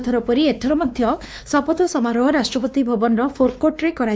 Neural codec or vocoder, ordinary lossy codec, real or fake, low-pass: codec, 16 kHz, 2 kbps, X-Codec, WavLM features, trained on Multilingual LibriSpeech; none; fake; none